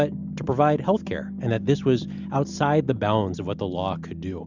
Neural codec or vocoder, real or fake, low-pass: none; real; 7.2 kHz